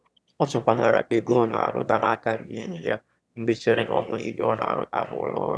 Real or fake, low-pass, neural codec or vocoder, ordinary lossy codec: fake; none; autoencoder, 22.05 kHz, a latent of 192 numbers a frame, VITS, trained on one speaker; none